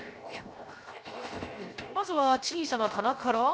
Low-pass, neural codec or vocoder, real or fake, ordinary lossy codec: none; codec, 16 kHz, 0.7 kbps, FocalCodec; fake; none